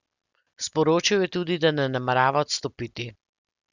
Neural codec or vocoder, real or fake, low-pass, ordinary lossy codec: none; real; 7.2 kHz; Opus, 64 kbps